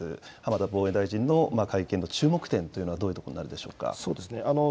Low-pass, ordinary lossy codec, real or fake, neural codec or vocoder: none; none; real; none